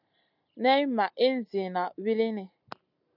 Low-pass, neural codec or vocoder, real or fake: 5.4 kHz; none; real